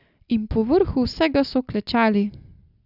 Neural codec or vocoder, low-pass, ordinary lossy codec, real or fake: none; 5.4 kHz; none; real